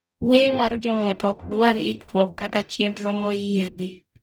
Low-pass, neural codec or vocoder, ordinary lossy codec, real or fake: none; codec, 44.1 kHz, 0.9 kbps, DAC; none; fake